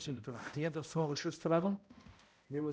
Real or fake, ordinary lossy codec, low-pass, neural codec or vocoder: fake; none; none; codec, 16 kHz, 0.5 kbps, X-Codec, HuBERT features, trained on balanced general audio